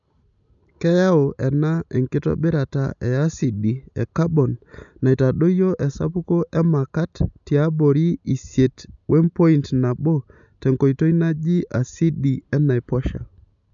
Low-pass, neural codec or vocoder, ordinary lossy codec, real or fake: 7.2 kHz; none; none; real